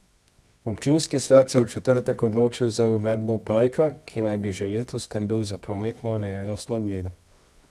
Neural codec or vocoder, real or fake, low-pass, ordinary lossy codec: codec, 24 kHz, 0.9 kbps, WavTokenizer, medium music audio release; fake; none; none